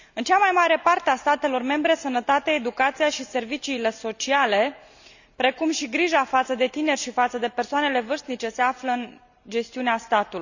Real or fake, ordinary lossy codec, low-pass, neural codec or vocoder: real; none; 7.2 kHz; none